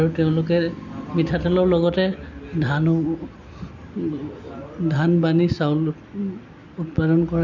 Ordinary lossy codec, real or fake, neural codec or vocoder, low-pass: none; real; none; 7.2 kHz